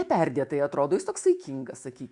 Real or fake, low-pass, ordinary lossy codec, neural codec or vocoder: fake; 10.8 kHz; Opus, 64 kbps; autoencoder, 48 kHz, 128 numbers a frame, DAC-VAE, trained on Japanese speech